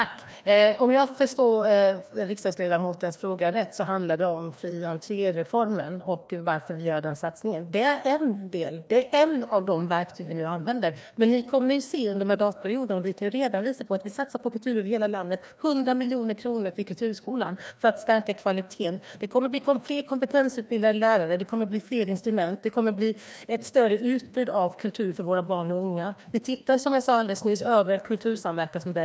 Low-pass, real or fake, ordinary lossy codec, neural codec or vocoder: none; fake; none; codec, 16 kHz, 1 kbps, FreqCodec, larger model